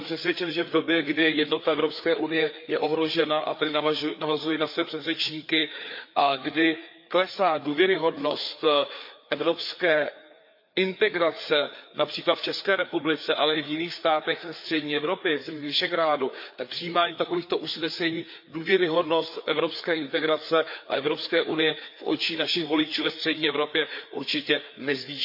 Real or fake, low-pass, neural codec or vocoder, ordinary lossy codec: fake; 5.4 kHz; codec, 16 kHz, 4 kbps, FreqCodec, larger model; MP3, 48 kbps